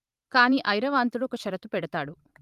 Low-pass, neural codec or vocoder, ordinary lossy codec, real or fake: 14.4 kHz; vocoder, 44.1 kHz, 128 mel bands every 512 samples, BigVGAN v2; Opus, 32 kbps; fake